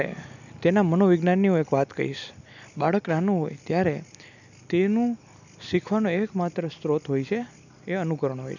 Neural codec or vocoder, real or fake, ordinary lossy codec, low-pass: none; real; none; 7.2 kHz